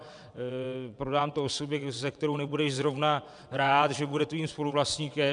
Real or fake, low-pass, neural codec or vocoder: fake; 9.9 kHz; vocoder, 22.05 kHz, 80 mel bands, WaveNeXt